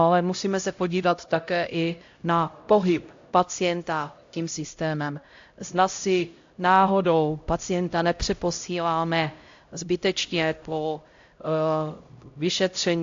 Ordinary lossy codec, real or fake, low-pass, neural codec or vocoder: AAC, 48 kbps; fake; 7.2 kHz; codec, 16 kHz, 0.5 kbps, X-Codec, HuBERT features, trained on LibriSpeech